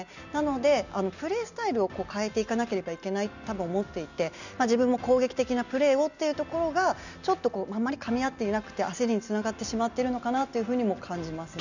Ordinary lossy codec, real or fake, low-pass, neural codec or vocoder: none; real; 7.2 kHz; none